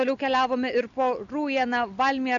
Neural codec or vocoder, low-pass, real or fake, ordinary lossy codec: none; 7.2 kHz; real; MP3, 96 kbps